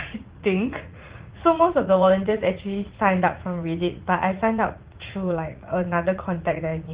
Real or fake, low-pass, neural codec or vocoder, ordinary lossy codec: fake; 3.6 kHz; vocoder, 44.1 kHz, 80 mel bands, Vocos; Opus, 16 kbps